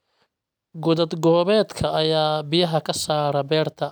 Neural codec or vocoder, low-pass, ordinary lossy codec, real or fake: none; none; none; real